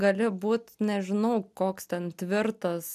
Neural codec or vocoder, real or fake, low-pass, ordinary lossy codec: none; real; 14.4 kHz; MP3, 96 kbps